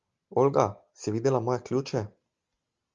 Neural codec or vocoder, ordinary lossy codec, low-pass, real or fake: none; Opus, 32 kbps; 7.2 kHz; real